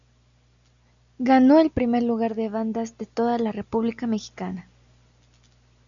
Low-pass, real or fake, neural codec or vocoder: 7.2 kHz; real; none